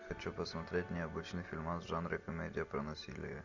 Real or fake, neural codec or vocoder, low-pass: real; none; 7.2 kHz